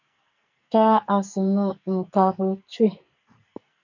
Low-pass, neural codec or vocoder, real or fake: 7.2 kHz; codec, 44.1 kHz, 2.6 kbps, SNAC; fake